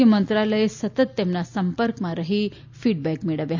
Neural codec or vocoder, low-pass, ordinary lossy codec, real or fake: none; 7.2 kHz; MP3, 64 kbps; real